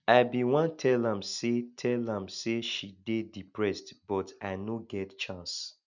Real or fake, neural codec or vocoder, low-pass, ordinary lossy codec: real; none; 7.2 kHz; none